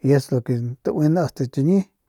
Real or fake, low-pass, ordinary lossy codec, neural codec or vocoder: real; 19.8 kHz; none; none